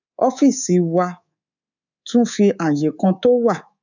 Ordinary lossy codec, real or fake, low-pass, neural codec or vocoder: none; fake; 7.2 kHz; codec, 24 kHz, 3.1 kbps, DualCodec